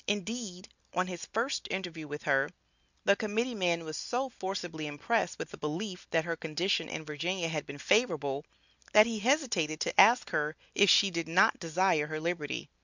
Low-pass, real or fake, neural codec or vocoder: 7.2 kHz; real; none